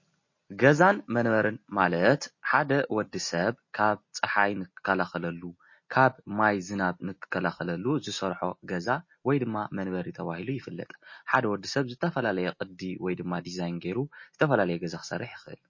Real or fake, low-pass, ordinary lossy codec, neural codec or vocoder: real; 7.2 kHz; MP3, 32 kbps; none